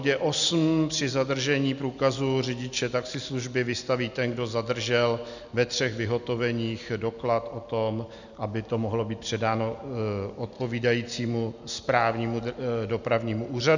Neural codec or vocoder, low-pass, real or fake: none; 7.2 kHz; real